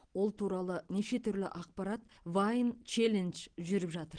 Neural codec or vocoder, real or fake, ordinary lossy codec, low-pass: none; real; Opus, 16 kbps; 9.9 kHz